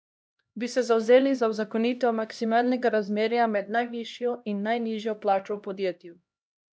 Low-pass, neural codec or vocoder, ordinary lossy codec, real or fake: none; codec, 16 kHz, 1 kbps, X-Codec, HuBERT features, trained on LibriSpeech; none; fake